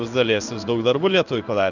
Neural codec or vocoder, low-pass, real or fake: codec, 16 kHz in and 24 kHz out, 1 kbps, XY-Tokenizer; 7.2 kHz; fake